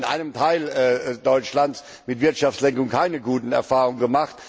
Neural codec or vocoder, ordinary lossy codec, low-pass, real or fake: none; none; none; real